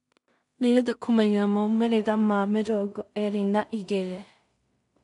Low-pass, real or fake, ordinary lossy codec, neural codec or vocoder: 10.8 kHz; fake; none; codec, 16 kHz in and 24 kHz out, 0.4 kbps, LongCat-Audio-Codec, two codebook decoder